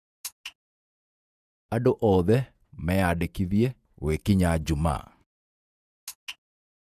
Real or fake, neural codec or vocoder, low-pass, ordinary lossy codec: fake; vocoder, 48 kHz, 128 mel bands, Vocos; 14.4 kHz; none